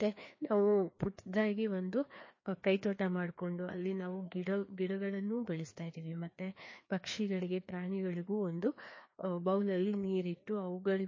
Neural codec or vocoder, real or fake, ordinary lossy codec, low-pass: codec, 16 kHz, 2 kbps, FreqCodec, larger model; fake; MP3, 32 kbps; 7.2 kHz